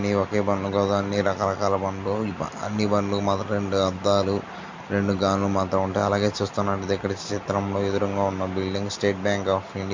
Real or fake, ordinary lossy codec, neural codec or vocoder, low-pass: real; MP3, 48 kbps; none; 7.2 kHz